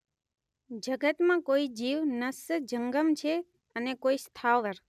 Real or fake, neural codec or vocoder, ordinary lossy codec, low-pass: real; none; none; 14.4 kHz